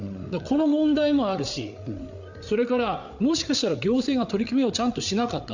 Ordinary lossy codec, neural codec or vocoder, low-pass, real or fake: none; codec, 16 kHz, 8 kbps, FreqCodec, larger model; 7.2 kHz; fake